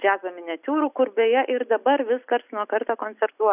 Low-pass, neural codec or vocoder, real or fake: 3.6 kHz; none; real